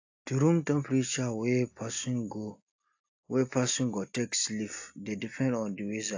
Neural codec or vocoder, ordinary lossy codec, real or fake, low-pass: none; AAC, 48 kbps; real; 7.2 kHz